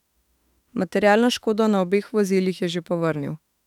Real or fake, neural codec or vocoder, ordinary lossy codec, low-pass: fake; autoencoder, 48 kHz, 32 numbers a frame, DAC-VAE, trained on Japanese speech; none; 19.8 kHz